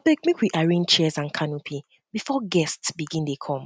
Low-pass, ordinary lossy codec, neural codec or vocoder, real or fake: none; none; none; real